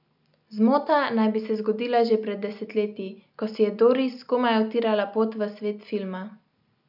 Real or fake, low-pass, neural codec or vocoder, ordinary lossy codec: real; 5.4 kHz; none; none